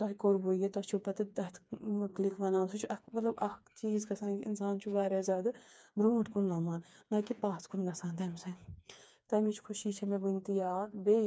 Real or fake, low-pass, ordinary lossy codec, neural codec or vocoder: fake; none; none; codec, 16 kHz, 4 kbps, FreqCodec, smaller model